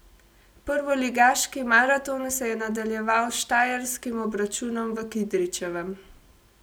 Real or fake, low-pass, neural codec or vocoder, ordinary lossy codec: real; none; none; none